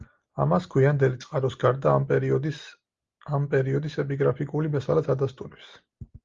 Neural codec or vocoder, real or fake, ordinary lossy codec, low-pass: none; real; Opus, 32 kbps; 7.2 kHz